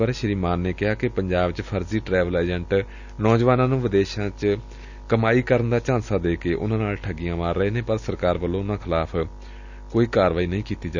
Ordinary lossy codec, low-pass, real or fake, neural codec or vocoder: none; 7.2 kHz; real; none